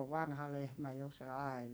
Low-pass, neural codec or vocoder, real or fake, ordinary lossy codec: none; codec, 44.1 kHz, 2.6 kbps, SNAC; fake; none